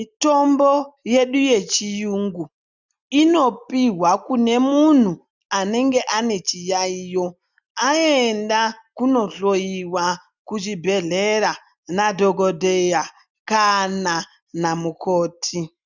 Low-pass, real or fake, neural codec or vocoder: 7.2 kHz; real; none